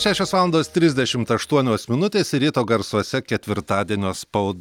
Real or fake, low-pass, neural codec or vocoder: real; 19.8 kHz; none